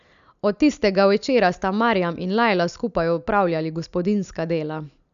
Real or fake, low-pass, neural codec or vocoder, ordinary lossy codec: real; 7.2 kHz; none; none